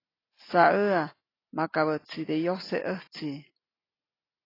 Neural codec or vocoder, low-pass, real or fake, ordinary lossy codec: none; 5.4 kHz; real; AAC, 24 kbps